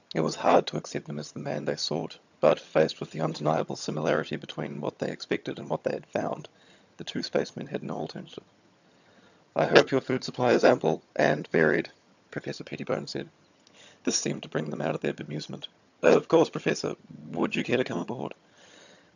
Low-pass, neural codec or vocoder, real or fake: 7.2 kHz; vocoder, 22.05 kHz, 80 mel bands, HiFi-GAN; fake